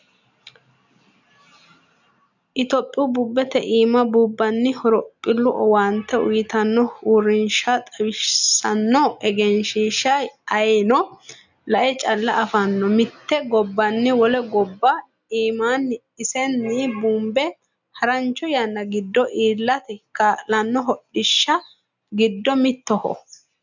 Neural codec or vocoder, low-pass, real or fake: none; 7.2 kHz; real